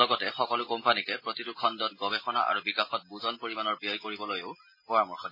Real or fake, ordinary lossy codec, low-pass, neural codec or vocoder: real; MP3, 24 kbps; 5.4 kHz; none